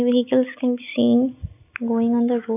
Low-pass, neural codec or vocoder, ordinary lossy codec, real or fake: 3.6 kHz; none; none; real